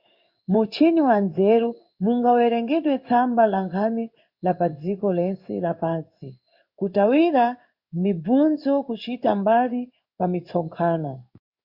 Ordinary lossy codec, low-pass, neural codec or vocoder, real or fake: Opus, 64 kbps; 5.4 kHz; codec, 16 kHz in and 24 kHz out, 1 kbps, XY-Tokenizer; fake